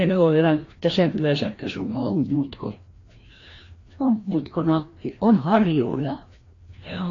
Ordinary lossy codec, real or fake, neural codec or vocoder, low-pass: AAC, 32 kbps; fake; codec, 16 kHz, 1 kbps, FreqCodec, larger model; 7.2 kHz